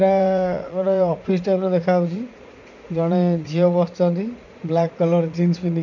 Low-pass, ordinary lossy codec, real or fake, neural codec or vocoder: 7.2 kHz; none; fake; vocoder, 44.1 kHz, 80 mel bands, Vocos